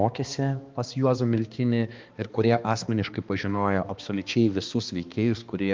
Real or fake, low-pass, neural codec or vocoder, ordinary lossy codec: fake; 7.2 kHz; codec, 16 kHz, 2 kbps, X-Codec, HuBERT features, trained on balanced general audio; Opus, 24 kbps